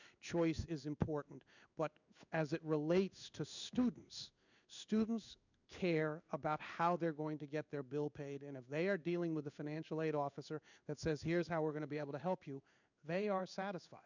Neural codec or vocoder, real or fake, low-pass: codec, 16 kHz in and 24 kHz out, 1 kbps, XY-Tokenizer; fake; 7.2 kHz